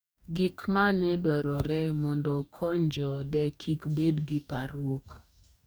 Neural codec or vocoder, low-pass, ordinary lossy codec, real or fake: codec, 44.1 kHz, 2.6 kbps, DAC; none; none; fake